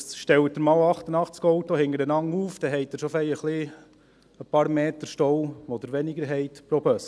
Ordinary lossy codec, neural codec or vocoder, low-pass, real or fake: none; none; none; real